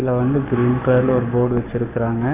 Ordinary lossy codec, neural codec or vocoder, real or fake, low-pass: none; codec, 44.1 kHz, 7.8 kbps, Pupu-Codec; fake; 3.6 kHz